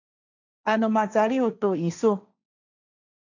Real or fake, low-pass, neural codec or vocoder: fake; 7.2 kHz; codec, 16 kHz, 1.1 kbps, Voila-Tokenizer